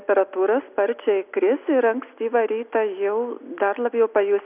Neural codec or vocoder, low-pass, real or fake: none; 3.6 kHz; real